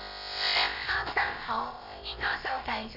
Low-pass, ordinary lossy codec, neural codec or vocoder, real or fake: 5.4 kHz; none; codec, 16 kHz, about 1 kbps, DyCAST, with the encoder's durations; fake